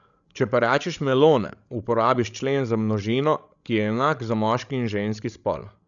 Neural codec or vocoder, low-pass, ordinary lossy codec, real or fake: codec, 16 kHz, 16 kbps, FreqCodec, larger model; 7.2 kHz; none; fake